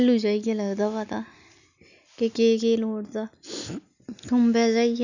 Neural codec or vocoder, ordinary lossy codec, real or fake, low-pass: none; none; real; 7.2 kHz